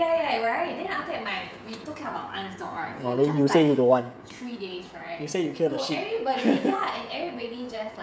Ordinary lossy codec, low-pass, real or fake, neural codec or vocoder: none; none; fake; codec, 16 kHz, 16 kbps, FreqCodec, smaller model